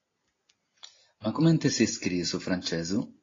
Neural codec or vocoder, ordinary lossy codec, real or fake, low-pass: none; AAC, 32 kbps; real; 7.2 kHz